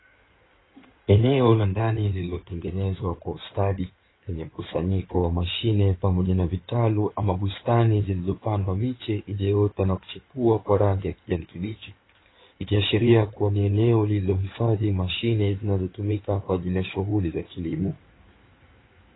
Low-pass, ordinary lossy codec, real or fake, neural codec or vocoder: 7.2 kHz; AAC, 16 kbps; fake; codec, 16 kHz in and 24 kHz out, 2.2 kbps, FireRedTTS-2 codec